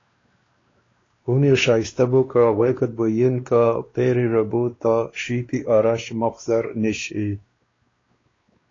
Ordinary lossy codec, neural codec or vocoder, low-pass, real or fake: AAC, 32 kbps; codec, 16 kHz, 2 kbps, X-Codec, WavLM features, trained on Multilingual LibriSpeech; 7.2 kHz; fake